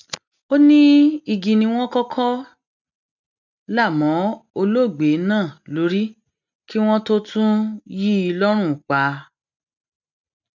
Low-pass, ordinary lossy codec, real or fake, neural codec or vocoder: 7.2 kHz; none; real; none